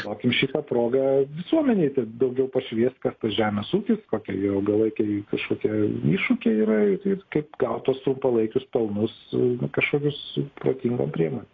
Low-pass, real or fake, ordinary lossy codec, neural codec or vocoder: 7.2 kHz; real; AAC, 32 kbps; none